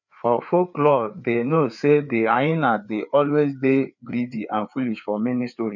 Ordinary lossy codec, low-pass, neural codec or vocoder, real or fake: none; 7.2 kHz; codec, 16 kHz, 4 kbps, FreqCodec, larger model; fake